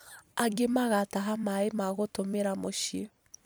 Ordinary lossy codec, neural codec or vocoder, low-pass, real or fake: none; vocoder, 44.1 kHz, 128 mel bands every 256 samples, BigVGAN v2; none; fake